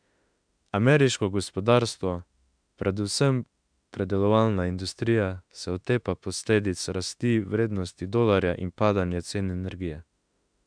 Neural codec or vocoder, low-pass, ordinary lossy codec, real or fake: autoencoder, 48 kHz, 32 numbers a frame, DAC-VAE, trained on Japanese speech; 9.9 kHz; MP3, 96 kbps; fake